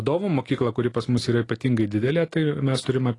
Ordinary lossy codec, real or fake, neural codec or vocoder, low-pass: AAC, 32 kbps; real; none; 10.8 kHz